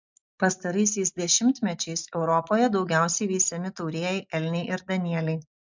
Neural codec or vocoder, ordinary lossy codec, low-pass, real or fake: none; MP3, 64 kbps; 7.2 kHz; real